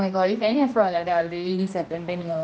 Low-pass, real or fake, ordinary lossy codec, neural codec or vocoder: none; fake; none; codec, 16 kHz, 1 kbps, X-Codec, HuBERT features, trained on general audio